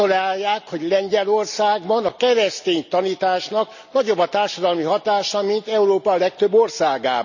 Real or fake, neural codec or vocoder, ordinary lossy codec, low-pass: real; none; none; 7.2 kHz